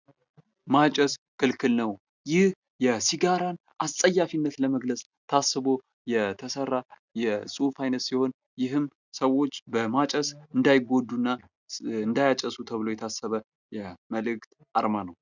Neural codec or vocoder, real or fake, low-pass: none; real; 7.2 kHz